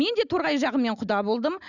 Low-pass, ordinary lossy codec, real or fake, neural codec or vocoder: 7.2 kHz; none; real; none